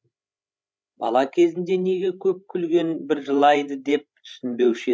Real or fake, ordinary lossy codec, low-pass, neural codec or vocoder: fake; none; none; codec, 16 kHz, 16 kbps, FreqCodec, larger model